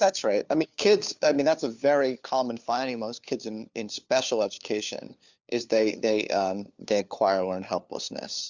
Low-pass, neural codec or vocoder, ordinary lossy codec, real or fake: 7.2 kHz; codec, 16 kHz, 4 kbps, FunCodec, trained on LibriTTS, 50 frames a second; Opus, 64 kbps; fake